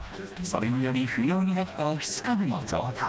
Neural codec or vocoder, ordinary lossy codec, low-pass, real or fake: codec, 16 kHz, 1 kbps, FreqCodec, smaller model; none; none; fake